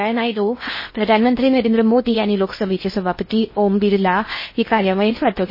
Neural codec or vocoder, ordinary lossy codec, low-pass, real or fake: codec, 16 kHz in and 24 kHz out, 0.6 kbps, FocalCodec, streaming, 4096 codes; MP3, 24 kbps; 5.4 kHz; fake